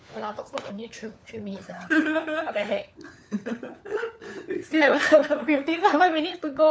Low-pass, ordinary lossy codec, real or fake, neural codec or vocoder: none; none; fake; codec, 16 kHz, 4 kbps, FunCodec, trained on LibriTTS, 50 frames a second